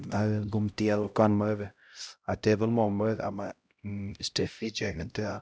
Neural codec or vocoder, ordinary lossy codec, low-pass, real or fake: codec, 16 kHz, 0.5 kbps, X-Codec, HuBERT features, trained on LibriSpeech; none; none; fake